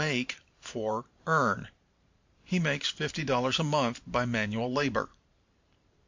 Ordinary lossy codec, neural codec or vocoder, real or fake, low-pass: MP3, 48 kbps; vocoder, 44.1 kHz, 128 mel bands every 512 samples, BigVGAN v2; fake; 7.2 kHz